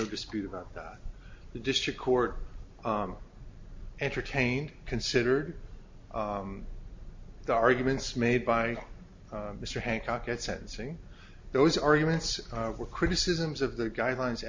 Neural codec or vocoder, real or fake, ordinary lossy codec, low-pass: none; real; MP3, 48 kbps; 7.2 kHz